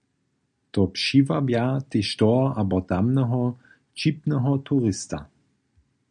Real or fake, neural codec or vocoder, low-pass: real; none; 9.9 kHz